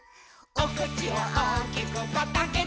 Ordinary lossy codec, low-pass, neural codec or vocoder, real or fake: none; none; none; real